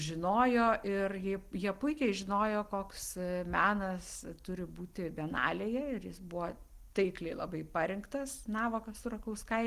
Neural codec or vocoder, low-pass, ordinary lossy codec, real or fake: none; 14.4 kHz; Opus, 24 kbps; real